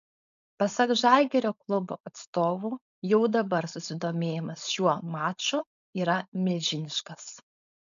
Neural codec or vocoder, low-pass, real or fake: codec, 16 kHz, 4.8 kbps, FACodec; 7.2 kHz; fake